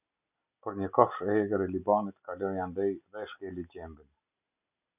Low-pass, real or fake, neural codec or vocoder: 3.6 kHz; real; none